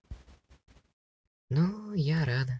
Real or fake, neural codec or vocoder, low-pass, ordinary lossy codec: real; none; none; none